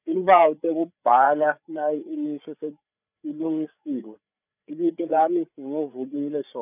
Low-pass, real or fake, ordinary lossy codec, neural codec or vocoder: 3.6 kHz; fake; none; codec, 16 kHz, 8 kbps, FreqCodec, larger model